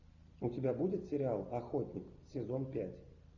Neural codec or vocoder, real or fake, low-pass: none; real; 7.2 kHz